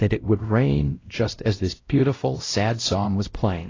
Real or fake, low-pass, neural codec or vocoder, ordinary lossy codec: fake; 7.2 kHz; codec, 16 kHz, 0.5 kbps, X-Codec, WavLM features, trained on Multilingual LibriSpeech; AAC, 32 kbps